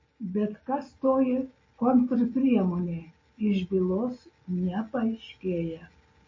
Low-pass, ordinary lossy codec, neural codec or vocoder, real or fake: 7.2 kHz; MP3, 32 kbps; none; real